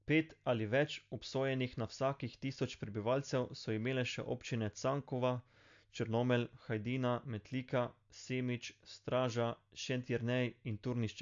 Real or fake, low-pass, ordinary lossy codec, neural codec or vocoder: real; 7.2 kHz; AAC, 64 kbps; none